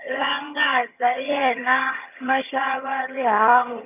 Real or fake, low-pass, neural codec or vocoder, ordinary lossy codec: fake; 3.6 kHz; vocoder, 22.05 kHz, 80 mel bands, HiFi-GAN; none